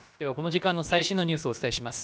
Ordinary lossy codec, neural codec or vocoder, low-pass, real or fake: none; codec, 16 kHz, about 1 kbps, DyCAST, with the encoder's durations; none; fake